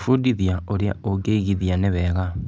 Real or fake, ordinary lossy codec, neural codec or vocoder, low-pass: real; none; none; none